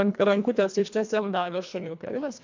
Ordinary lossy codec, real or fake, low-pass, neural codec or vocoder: MP3, 64 kbps; fake; 7.2 kHz; codec, 24 kHz, 1.5 kbps, HILCodec